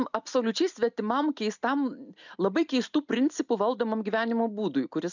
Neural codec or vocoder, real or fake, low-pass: none; real; 7.2 kHz